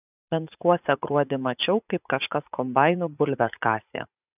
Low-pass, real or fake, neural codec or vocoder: 3.6 kHz; fake; vocoder, 44.1 kHz, 80 mel bands, Vocos